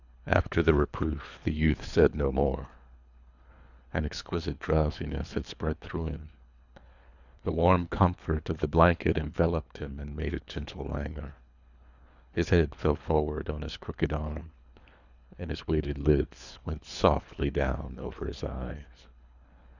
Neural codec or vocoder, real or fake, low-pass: codec, 24 kHz, 3 kbps, HILCodec; fake; 7.2 kHz